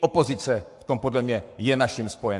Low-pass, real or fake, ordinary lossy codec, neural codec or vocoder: 10.8 kHz; fake; AAC, 64 kbps; codec, 44.1 kHz, 7.8 kbps, Pupu-Codec